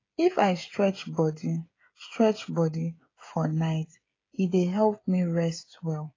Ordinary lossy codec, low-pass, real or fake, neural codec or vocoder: AAC, 32 kbps; 7.2 kHz; fake; codec, 16 kHz, 16 kbps, FreqCodec, smaller model